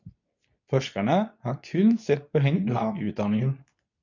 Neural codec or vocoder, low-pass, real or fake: codec, 24 kHz, 0.9 kbps, WavTokenizer, medium speech release version 2; 7.2 kHz; fake